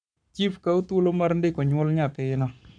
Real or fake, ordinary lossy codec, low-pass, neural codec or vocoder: fake; none; 9.9 kHz; codec, 44.1 kHz, 7.8 kbps, Pupu-Codec